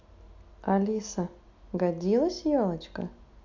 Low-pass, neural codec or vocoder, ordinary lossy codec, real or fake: 7.2 kHz; none; MP3, 48 kbps; real